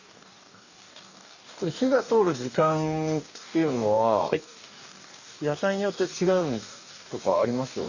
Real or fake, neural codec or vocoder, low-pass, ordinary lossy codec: fake; codec, 44.1 kHz, 2.6 kbps, DAC; 7.2 kHz; none